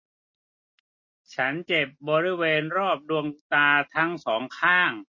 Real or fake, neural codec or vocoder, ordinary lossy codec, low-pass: real; none; MP3, 32 kbps; 7.2 kHz